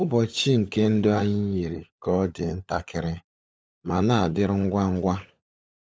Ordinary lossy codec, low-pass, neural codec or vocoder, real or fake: none; none; codec, 16 kHz, 16 kbps, FunCodec, trained on LibriTTS, 50 frames a second; fake